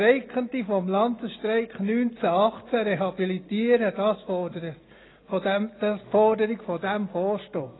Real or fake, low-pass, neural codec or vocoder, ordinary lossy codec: real; 7.2 kHz; none; AAC, 16 kbps